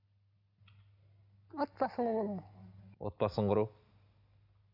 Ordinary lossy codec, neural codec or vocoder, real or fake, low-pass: none; none; real; 5.4 kHz